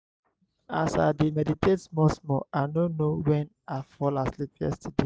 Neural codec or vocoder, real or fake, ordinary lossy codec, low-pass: none; real; none; none